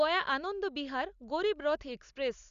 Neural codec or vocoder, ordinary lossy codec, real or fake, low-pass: none; Opus, 64 kbps; real; 7.2 kHz